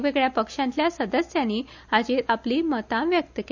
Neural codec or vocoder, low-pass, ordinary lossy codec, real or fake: none; 7.2 kHz; none; real